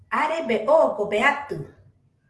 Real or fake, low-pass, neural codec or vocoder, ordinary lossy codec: real; 10.8 kHz; none; Opus, 16 kbps